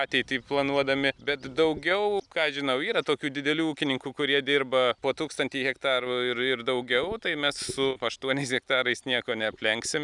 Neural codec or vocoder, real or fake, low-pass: none; real; 10.8 kHz